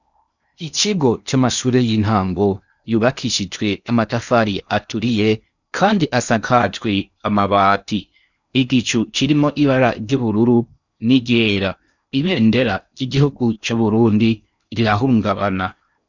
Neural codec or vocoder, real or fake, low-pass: codec, 16 kHz in and 24 kHz out, 0.8 kbps, FocalCodec, streaming, 65536 codes; fake; 7.2 kHz